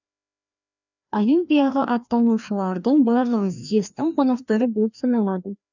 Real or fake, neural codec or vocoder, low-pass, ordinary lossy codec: fake; codec, 16 kHz, 1 kbps, FreqCodec, larger model; 7.2 kHz; none